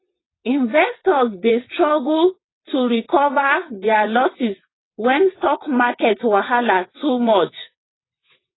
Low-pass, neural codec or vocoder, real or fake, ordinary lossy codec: 7.2 kHz; vocoder, 22.05 kHz, 80 mel bands, WaveNeXt; fake; AAC, 16 kbps